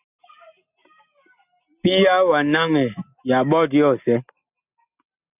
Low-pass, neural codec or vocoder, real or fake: 3.6 kHz; none; real